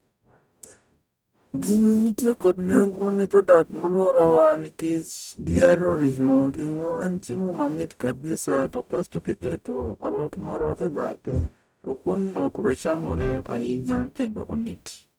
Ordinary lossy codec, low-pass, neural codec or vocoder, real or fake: none; none; codec, 44.1 kHz, 0.9 kbps, DAC; fake